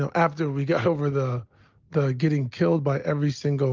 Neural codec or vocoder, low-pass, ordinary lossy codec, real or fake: none; 7.2 kHz; Opus, 32 kbps; real